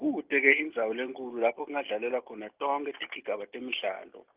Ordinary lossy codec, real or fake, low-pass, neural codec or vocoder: Opus, 32 kbps; real; 3.6 kHz; none